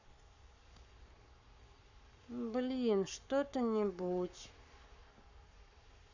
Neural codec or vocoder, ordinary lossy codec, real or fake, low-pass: codec, 44.1 kHz, 7.8 kbps, Pupu-Codec; none; fake; 7.2 kHz